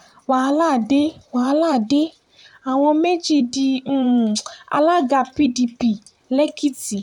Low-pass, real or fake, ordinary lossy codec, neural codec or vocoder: 19.8 kHz; fake; none; vocoder, 44.1 kHz, 128 mel bands, Pupu-Vocoder